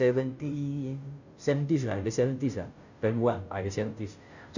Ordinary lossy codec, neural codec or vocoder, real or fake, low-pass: none; codec, 16 kHz, 0.5 kbps, FunCodec, trained on Chinese and English, 25 frames a second; fake; 7.2 kHz